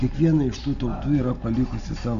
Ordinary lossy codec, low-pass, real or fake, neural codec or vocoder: MP3, 64 kbps; 7.2 kHz; real; none